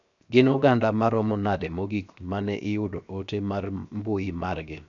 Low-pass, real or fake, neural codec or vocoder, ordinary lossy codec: 7.2 kHz; fake; codec, 16 kHz, 0.7 kbps, FocalCodec; none